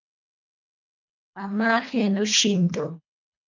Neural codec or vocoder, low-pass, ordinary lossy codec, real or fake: codec, 24 kHz, 1.5 kbps, HILCodec; 7.2 kHz; MP3, 64 kbps; fake